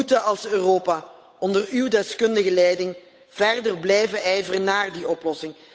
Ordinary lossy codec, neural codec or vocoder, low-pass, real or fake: none; codec, 16 kHz, 8 kbps, FunCodec, trained on Chinese and English, 25 frames a second; none; fake